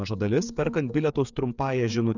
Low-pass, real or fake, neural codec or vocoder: 7.2 kHz; fake; codec, 16 kHz, 8 kbps, FreqCodec, smaller model